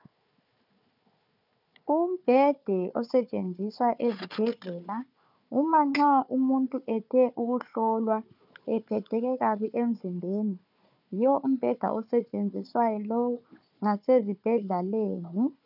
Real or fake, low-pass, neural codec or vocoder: fake; 5.4 kHz; codec, 16 kHz, 4 kbps, FunCodec, trained on Chinese and English, 50 frames a second